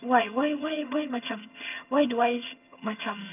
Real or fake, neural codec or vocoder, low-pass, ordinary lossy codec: fake; vocoder, 22.05 kHz, 80 mel bands, HiFi-GAN; 3.6 kHz; none